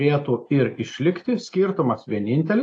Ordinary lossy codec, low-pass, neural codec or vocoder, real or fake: AAC, 48 kbps; 9.9 kHz; none; real